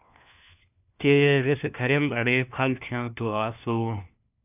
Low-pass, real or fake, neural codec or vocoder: 3.6 kHz; fake; codec, 16 kHz, 1 kbps, FunCodec, trained on LibriTTS, 50 frames a second